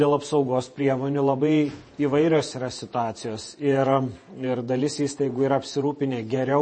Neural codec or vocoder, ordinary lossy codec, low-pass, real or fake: vocoder, 48 kHz, 128 mel bands, Vocos; MP3, 32 kbps; 9.9 kHz; fake